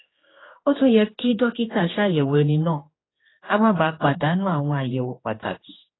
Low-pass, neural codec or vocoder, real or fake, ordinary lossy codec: 7.2 kHz; codec, 16 kHz, 2 kbps, X-Codec, HuBERT features, trained on general audio; fake; AAC, 16 kbps